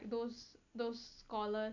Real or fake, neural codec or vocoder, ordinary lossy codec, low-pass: real; none; none; 7.2 kHz